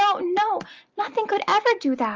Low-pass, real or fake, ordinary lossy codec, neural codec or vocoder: 7.2 kHz; real; Opus, 24 kbps; none